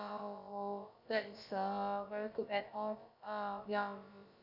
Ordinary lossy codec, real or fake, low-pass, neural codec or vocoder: none; fake; 5.4 kHz; codec, 16 kHz, about 1 kbps, DyCAST, with the encoder's durations